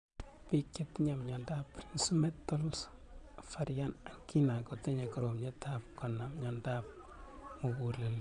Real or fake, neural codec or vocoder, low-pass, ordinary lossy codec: fake; vocoder, 22.05 kHz, 80 mel bands, Vocos; 9.9 kHz; none